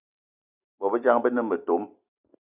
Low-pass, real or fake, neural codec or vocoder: 3.6 kHz; real; none